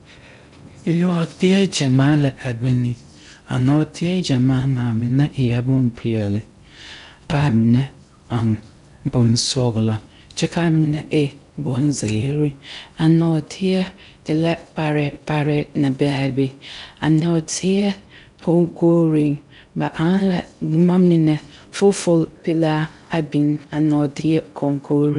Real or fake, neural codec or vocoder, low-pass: fake; codec, 16 kHz in and 24 kHz out, 0.6 kbps, FocalCodec, streaming, 4096 codes; 10.8 kHz